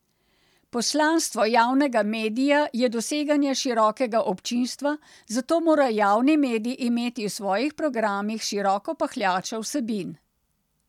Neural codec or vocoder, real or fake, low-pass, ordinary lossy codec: none; real; 19.8 kHz; none